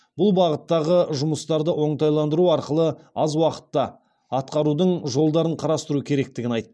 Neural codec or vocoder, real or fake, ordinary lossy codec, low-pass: none; real; none; none